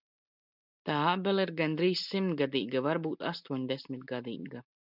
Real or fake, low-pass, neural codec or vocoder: real; 5.4 kHz; none